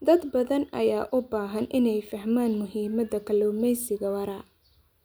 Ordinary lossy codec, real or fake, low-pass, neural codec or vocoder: none; real; none; none